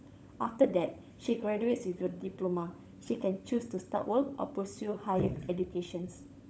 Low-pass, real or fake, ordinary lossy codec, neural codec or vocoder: none; fake; none; codec, 16 kHz, 16 kbps, FunCodec, trained on LibriTTS, 50 frames a second